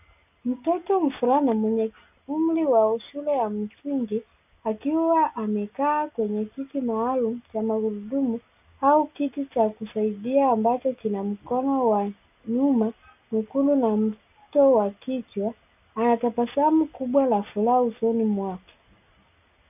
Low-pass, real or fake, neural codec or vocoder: 3.6 kHz; real; none